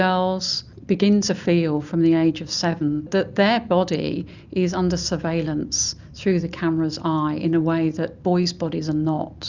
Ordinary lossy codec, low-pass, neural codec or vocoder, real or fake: Opus, 64 kbps; 7.2 kHz; none; real